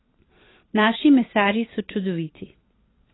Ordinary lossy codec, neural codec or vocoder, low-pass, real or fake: AAC, 16 kbps; vocoder, 44.1 kHz, 128 mel bands every 512 samples, BigVGAN v2; 7.2 kHz; fake